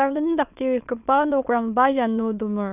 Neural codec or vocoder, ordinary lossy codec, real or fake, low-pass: autoencoder, 22.05 kHz, a latent of 192 numbers a frame, VITS, trained on many speakers; none; fake; 3.6 kHz